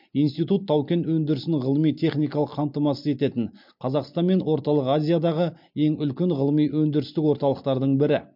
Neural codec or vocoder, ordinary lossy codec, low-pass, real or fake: none; MP3, 48 kbps; 5.4 kHz; real